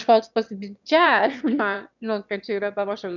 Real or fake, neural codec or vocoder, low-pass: fake; autoencoder, 22.05 kHz, a latent of 192 numbers a frame, VITS, trained on one speaker; 7.2 kHz